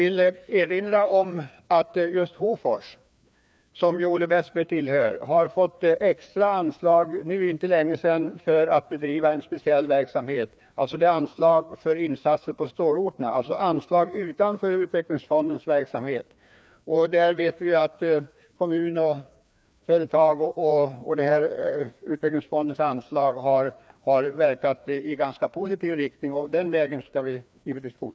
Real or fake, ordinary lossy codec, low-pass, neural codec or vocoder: fake; none; none; codec, 16 kHz, 2 kbps, FreqCodec, larger model